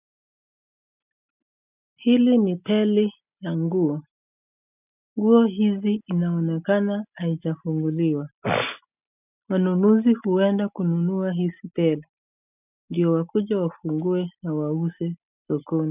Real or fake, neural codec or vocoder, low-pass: real; none; 3.6 kHz